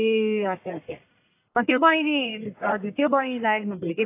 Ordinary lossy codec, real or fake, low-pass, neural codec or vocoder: AAC, 24 kbps; fake; 3.6 kHz; codec, 44.1 kHz, 1.7 kbps, Pupu-Codec